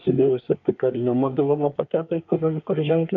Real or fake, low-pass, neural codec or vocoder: fake; 7.2 kHz; codec, 24 kHz, 1 kbps, SNAC